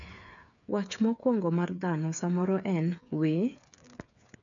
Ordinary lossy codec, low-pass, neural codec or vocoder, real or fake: none; 7.2 kHz; codec, 16 kHz, 8 kbps, FreqCodec, smaller model; fake